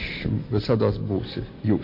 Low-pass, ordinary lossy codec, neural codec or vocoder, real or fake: 5.4 kHz; MP3, 32 kbps; none; real